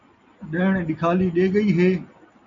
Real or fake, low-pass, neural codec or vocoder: real; 7.2 kHz; none